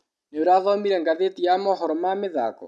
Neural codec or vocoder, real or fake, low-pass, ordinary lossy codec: none; real; none; none